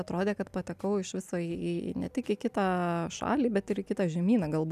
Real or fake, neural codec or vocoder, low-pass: real; none; 14.4 kHz